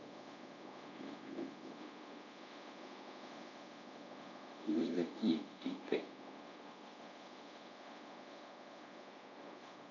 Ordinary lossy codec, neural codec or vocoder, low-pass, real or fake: none; codec, 24 kHz, 0.5 kbps, DualCodec; 7.2 kHz; fake